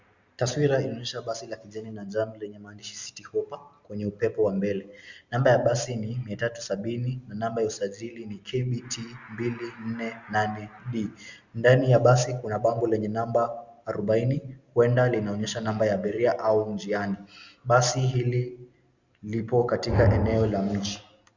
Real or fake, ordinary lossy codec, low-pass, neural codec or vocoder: real; Opus, 64 kbps; 7.2 kHz; none